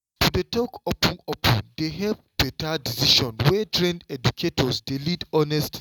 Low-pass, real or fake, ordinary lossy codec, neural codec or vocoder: 19.8 kHz; real; none; none